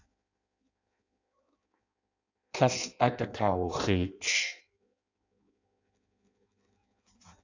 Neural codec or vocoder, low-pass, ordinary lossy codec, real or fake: codec, 16 kHz in and 24 kHz out, 0.6 kbps, FireRedTTS-2 codec; 7.2 kHz; AAC, 48 kbps; fake